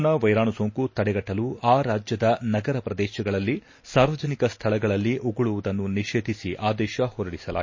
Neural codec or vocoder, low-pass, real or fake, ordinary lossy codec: none; 7.2 kHz; real; MP3, 32 kbps